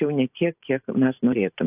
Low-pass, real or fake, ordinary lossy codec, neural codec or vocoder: 3.6 kHz; fake; AAC, 32 kbps; vocoder, 44.1 kHz, 128 mel bands every 512 samples, BigVGAN v2